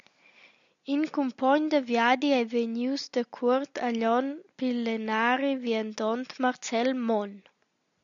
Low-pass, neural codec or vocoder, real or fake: 7.2 kHz; none; real